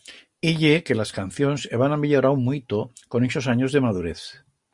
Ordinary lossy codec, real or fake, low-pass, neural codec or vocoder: Opus, 64 kbps; real; 10.8 kHz; none